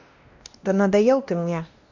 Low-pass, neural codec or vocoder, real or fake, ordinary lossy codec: 7.2 kHz; codec, 16 kHz, 1 kbps, X-Codec, WavLM features, trained on Multilingual LibriSpeech; fake; none